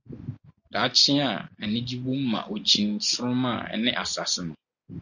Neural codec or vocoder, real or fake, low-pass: none; real; 7.2 kHz